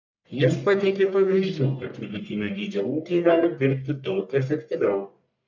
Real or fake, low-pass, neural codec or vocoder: fake; 7.2 kHz; codec, 44.1 kHz, 1.7 kbps, Pupu-Codec